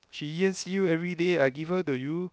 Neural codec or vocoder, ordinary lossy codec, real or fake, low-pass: codec, 16 kHz, 0.7 kbps, FocalCodec; none; fake; none